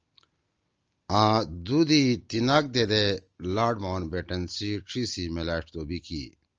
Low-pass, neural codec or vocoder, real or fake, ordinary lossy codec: 7.2 kHz; none; real; Opus, 24 kbps